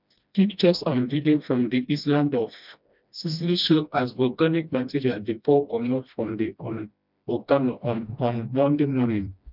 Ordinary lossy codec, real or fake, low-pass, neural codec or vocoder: none; fake; 5.4 kHz; codec, 16 kHz, 1 kbps, FreqCodec, smaller model